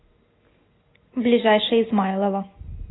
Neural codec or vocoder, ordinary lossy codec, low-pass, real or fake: none; AAC, 16 kbps; 7.2 kHz; real